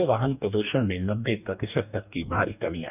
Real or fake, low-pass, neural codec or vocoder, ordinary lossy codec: fake; 3.6 kHz; codec, 44.1 kHz, 2.6 kbps, DAC; none